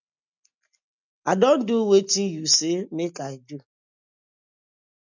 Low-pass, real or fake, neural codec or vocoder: 7.2 kHz; real; none